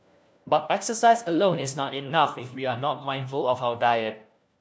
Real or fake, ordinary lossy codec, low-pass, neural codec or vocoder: fake; none; none; codec, 16 kHz, 1 kbps, FunCodec, trained on LibriTTS, 50 frames a second